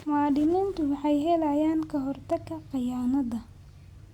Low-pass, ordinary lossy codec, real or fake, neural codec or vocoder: 19.8 kHz; Opus, 64 kbps; real; none